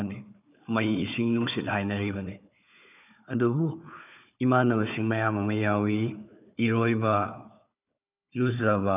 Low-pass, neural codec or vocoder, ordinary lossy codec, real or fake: 3.6 kHz; codec, 16 kHz, 4 kbps, FunCodec, trained on Chinese and English, 50 frames a second; none; fake